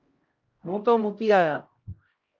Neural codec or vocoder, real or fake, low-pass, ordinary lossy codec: codec, 16 kHz, 0.5 kbps, X-Codec, HuBERT features, trained on LibriSpeech; fake; 7.2 kHz; Opus, 32 kbps